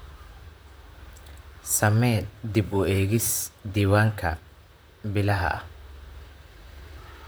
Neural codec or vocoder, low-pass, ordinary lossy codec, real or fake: vocoder, 44.1 kHz, 128 mel bands, Pupu-Vocoder; none; none; fake